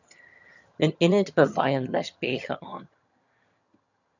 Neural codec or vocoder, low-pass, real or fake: vocoder, 22.05 kHz, 80 mel bands, HiFi-GAN; 7.2 kHz; fake